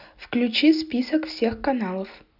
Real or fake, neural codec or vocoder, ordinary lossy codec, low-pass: real; none; none; 5.4 kHz